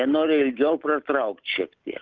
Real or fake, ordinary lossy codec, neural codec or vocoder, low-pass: real; Opus, 32 kbps; none; 7.2 kHz